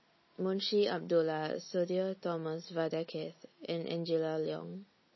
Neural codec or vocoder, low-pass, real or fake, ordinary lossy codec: none; 7.2 kHz; real; MP3, 24 kbps